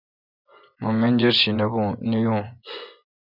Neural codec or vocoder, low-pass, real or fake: vocoder, 24 kHz, 100 mel bands, Vocos; 5.4 kHz; fake